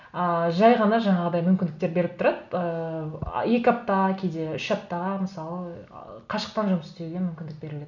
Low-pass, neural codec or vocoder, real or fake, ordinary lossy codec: 7.2 kHz; none; real; none